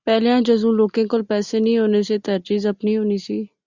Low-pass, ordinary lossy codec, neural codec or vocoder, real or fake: 7.2 kHz; Opus, 64 kbps; none; real